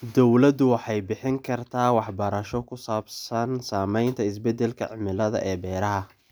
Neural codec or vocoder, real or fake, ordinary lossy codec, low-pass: none; real; none; none